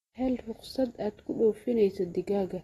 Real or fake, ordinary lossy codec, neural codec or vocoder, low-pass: real; AAC, 32 kbps; none; 19.8 kHz